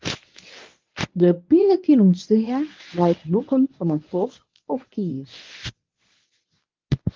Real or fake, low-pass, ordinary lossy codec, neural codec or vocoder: fake; 7.2 kHz; Opus, 32 kbps; codec, 24 kHz, 0.9 kbps, WavTokenizer, medium speech release version 1